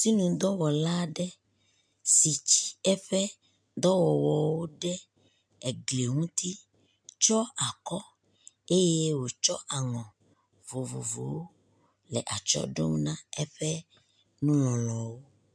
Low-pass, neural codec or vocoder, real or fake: 9.9 kHz; none; real